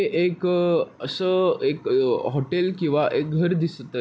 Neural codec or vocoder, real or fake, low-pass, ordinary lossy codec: none; real; none; none